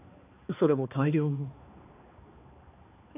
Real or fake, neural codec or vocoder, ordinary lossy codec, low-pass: fake; codec, 16 kHz, 2 kbps, X-Codec, HuBERT features, trained on balanced general audio; none; 3.6 kHz